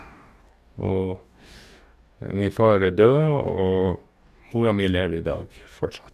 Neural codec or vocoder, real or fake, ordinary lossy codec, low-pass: codec, 44.1 kHz, 2.6 kbps, DAC; fake; none; 14.4 kHz